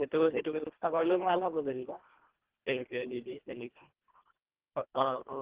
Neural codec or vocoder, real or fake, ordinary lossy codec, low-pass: codec, 24 kHz, 1.5 kbps, HILCodec; fake; Opus, 16 kbps; 3.6 kHz